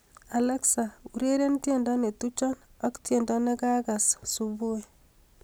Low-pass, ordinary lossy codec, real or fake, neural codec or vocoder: none; none; real; none